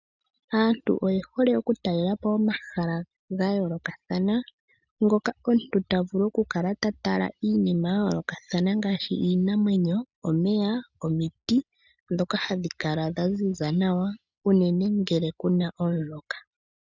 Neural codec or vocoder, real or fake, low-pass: none; real; 7.2 kHz